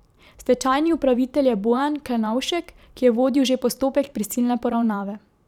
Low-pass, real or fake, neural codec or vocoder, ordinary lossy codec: 19.8 kHz; fake; vocoder, 44.1 kHz, 128 mel bands every 512 samples, BigVGAN v2; none